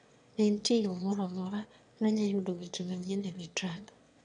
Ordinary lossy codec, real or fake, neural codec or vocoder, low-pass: none; fake; autoencoder, 22.05 kHz, a latent of 192 numbers a frame, VITS, trained on one speaker; 9.9 kHz